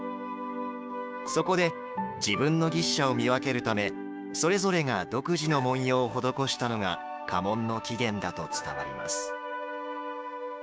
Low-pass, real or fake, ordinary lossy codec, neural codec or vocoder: none; fake; none; codec, 16 kHz, 6 kbps, DAC